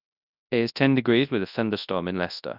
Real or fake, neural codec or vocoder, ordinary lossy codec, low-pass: fake; codec, 24 kHz, 0.9 kbps, WavTokenizer, large speech release; none; 5.4 kHz